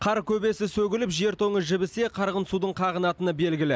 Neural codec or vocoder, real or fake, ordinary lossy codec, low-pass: none; real; none; none